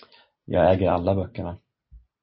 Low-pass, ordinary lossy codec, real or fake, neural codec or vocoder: 7.2 kHz; MP3, 24 kbps; real; none